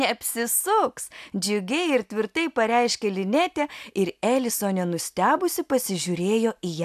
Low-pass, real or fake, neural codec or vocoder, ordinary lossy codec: 14.4 kHz; real; none; AAC, 96 kbps